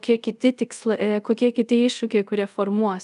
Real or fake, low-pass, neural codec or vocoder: fake; 10.8 kHz; codec, 24 kHz, 0.5 kbps, DualCodec